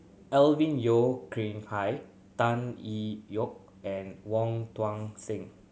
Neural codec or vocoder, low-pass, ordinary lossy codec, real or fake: none; none; none; real